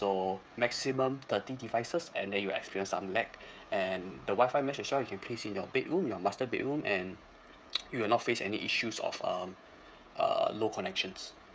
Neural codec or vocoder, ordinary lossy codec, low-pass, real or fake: codec, 16 kHz, 16 kbps, FreqCodec, smaller model; none; none; fake